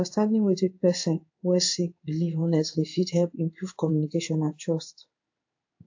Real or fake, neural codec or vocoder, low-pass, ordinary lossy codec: fake; codec, 16 kHz in and 24 kHz out, 1 kbps, XY-Tokenizer; 7.2 kHz; AAC, 48 kbps